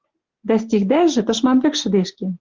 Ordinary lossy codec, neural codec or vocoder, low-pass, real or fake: Opus, 16 kbps; none; 7.2 kHz; real